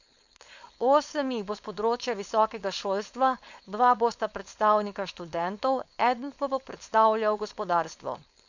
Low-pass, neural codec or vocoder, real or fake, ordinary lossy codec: 7.2 kHz; codec, 16 kHz, 4.8 kbps, FACodec; fake; none